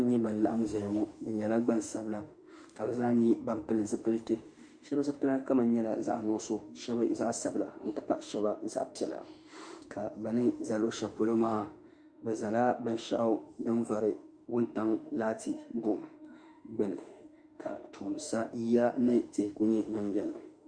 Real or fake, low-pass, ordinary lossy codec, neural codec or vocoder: fake; 9.9 kHz; Opus, 64 kbps; autoencoder, 48 kHz, 32 numbers a frame, DAC-VAE, trained on Japanese speech